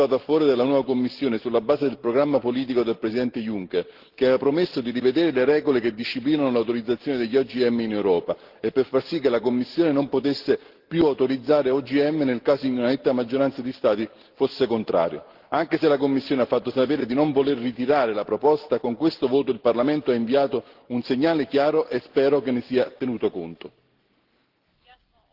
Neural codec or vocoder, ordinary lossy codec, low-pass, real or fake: none; Opus, 16 kbps; 5.4 kHz; real